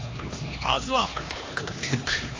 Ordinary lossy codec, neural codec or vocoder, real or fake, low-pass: AAC, 32 kbps; codec, 16 kHz, 2 kbps, X-Codec, HuBERT features, trained on LibriSpeech; fake; 7.2 kHz